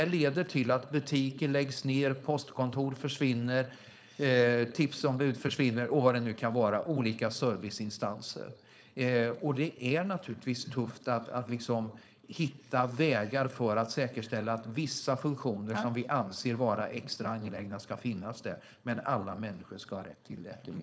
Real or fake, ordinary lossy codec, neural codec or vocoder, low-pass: fake; none; codec, 16 kHz, 4.8 kbps, FACodec; none